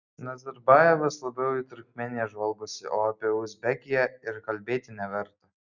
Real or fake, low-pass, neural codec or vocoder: real; 7.2 kHz; none